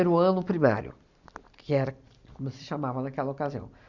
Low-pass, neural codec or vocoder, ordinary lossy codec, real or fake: 7.2 kHz; none; Opus, 64 kbps; real